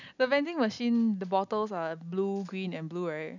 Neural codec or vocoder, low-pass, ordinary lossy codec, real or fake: none; 7.2 kHz; none; real